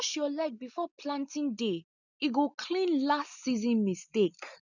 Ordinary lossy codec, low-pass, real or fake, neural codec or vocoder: none; 7.2 kHz; real; none